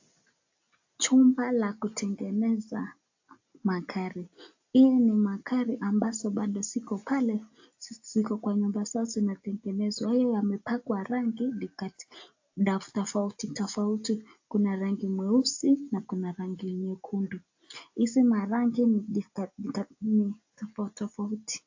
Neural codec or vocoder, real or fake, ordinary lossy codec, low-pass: none; real; AAC, 48 kbps; 7.2 kHz